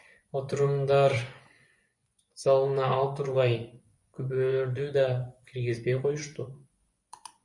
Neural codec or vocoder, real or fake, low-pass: vocoder, 24 kHz, 100 mel bands, Vocos; fake; 10.8 kHz